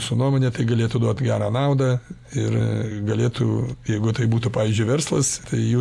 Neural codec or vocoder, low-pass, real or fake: none; 14.4 kHz; real